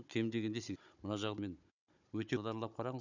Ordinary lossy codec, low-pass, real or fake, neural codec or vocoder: none; 7.2 kHz; fake; codec, 16 kHz, 16 kbps, FunCodec, trained on Chinese and English, 50 frames a second